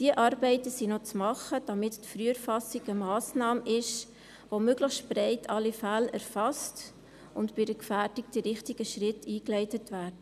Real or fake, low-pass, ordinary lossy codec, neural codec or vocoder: real; 14.4 kHz; none; none